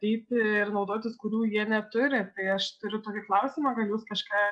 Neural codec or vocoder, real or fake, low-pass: none; real; 10.8 kHz